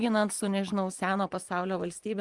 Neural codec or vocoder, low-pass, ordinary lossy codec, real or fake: vocoder, 24 kHz, 100 mel bands, Vocos; 10.8 kHz; Opus, 32 kbps; fake